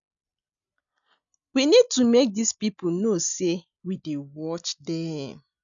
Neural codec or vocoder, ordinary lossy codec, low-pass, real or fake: none; none; 7.2 kHz; real